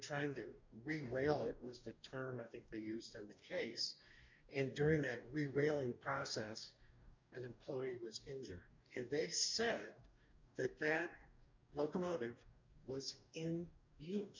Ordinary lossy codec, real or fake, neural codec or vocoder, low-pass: MP3, 64 kbps; fake; codec, 44.1 kHz, 2.6 kbps, DAC; 7.2 kHz